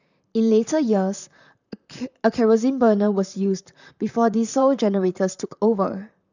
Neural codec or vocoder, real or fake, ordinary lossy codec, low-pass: vocoder, 44.1 kHz, 128 mel bands, Pupu-Vocoder; fake; none; 7.2 kHz